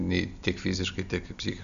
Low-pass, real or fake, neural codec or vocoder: 7.2 kHz; real; none